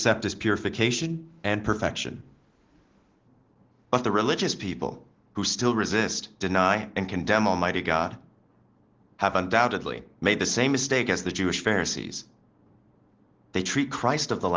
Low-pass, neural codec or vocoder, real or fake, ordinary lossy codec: 7.2 kHz; none; real; Opus, 16 kbps